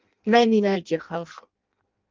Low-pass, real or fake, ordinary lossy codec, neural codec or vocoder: 7.2 kHz; fake; Opus, 32 kbps; codec, 16 kHz in and 24 kHz out, 0.6 kbps, FireRedTTS-2 codec